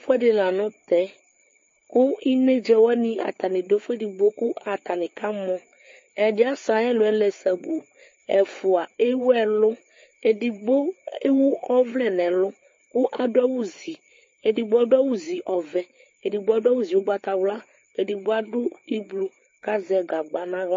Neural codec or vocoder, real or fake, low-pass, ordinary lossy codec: codec, 16 kHz, 4 kbps, FreqCodec, larger model; fake; 7.2 kHz; MP3, 32 kbps